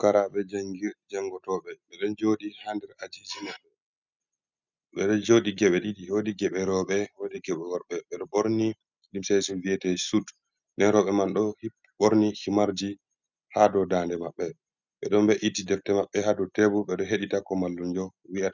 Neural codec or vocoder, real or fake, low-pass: none; real; 7.2 kHz